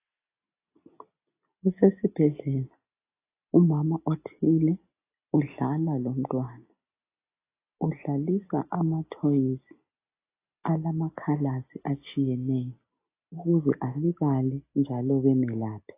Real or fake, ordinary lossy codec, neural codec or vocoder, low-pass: real; AAC, 24 kbps; none; 3.6 kHz